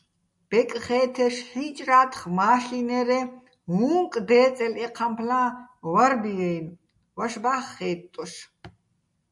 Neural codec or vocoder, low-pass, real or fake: none; 10.8 kHz; real